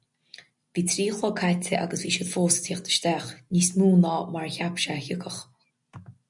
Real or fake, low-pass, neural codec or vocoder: real; 10.8 kHz; none